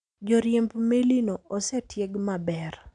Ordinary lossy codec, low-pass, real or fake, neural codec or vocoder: none; 9.9 kHz; real; none